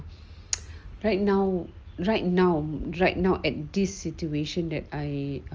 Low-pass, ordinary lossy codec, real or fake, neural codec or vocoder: 7.2 kHz; Opus, 24 kbps; real; none